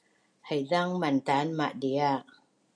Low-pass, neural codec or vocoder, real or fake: 9.9 kHz; none; real